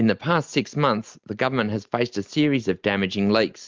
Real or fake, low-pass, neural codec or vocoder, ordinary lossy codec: real; 7.2 kHz; none; Opus, 32 kbps